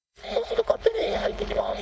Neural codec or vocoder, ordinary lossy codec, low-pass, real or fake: codec, 16 kHz, 4.8 kbps, FACodec; none; none; fake